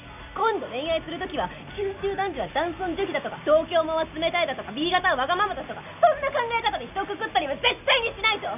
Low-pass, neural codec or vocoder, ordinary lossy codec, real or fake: 3.6 kHz; none; none; real